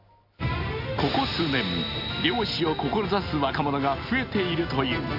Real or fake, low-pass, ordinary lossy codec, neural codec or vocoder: real; 5.4 kHz; AAC, 48 kbps; none